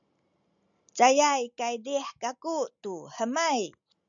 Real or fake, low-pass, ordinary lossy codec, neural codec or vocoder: real; 7.2 kHz; MP3, 96 kbps; none